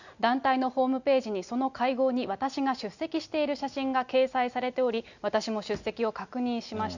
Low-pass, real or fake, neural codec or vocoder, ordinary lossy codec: 7.2 kHz; real; none; none